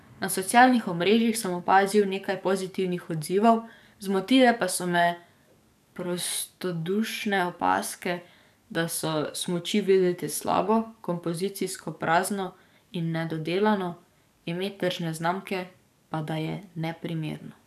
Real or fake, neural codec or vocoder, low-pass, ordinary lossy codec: fake; codec, 44.1 kHz, 7.8 kbps, DAC; 14.4 kHz; none